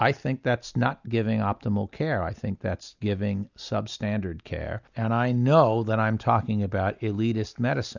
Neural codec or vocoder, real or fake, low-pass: none; real; 7.2 kHz